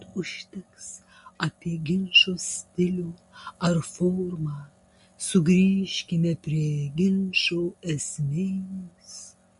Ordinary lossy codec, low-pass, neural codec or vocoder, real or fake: MP3, 48 kbps; 14.4 kHz; none; real